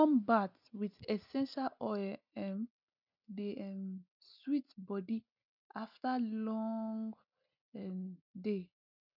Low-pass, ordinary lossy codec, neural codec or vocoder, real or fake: 5.4 kHz; none; none; real